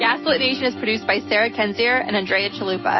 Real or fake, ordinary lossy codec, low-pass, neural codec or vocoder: real; MP3, 24 kbps; 7.2 kHz; none